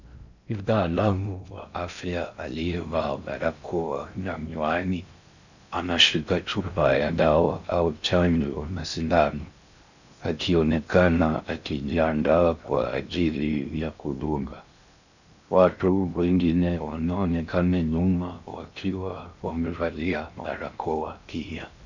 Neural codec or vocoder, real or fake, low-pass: codec, 16 kHz in and 24 kHz out, 0.6 kbps, FocalCodec, streaming, 4096 codes; fake; 7.2 kHz